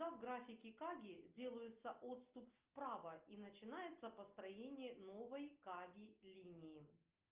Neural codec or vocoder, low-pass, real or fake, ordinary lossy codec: none; 3.6 kHz; real; Opus, 24 kbps